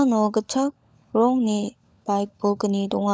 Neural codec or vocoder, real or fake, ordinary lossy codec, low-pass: codec, 16 kHz, 16 kbps, FunCodec, trained on LibriTTS, 50 frames a second; fake; none; none